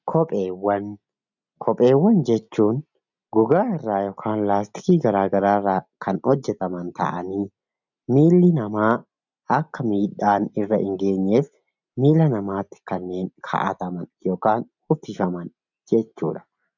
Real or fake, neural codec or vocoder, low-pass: real; none; 7.2 kHz